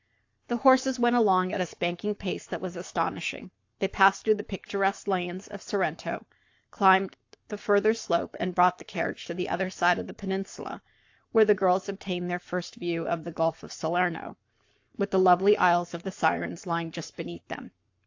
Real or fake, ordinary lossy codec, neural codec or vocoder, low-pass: fake; AAC, 48 kbps; codec, 44.1 kHz, 7.8 kbps, Pupu-Codec; 7.2 kHz